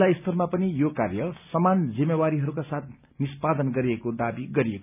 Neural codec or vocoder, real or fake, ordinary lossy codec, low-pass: none; real; none; 3.6 kHz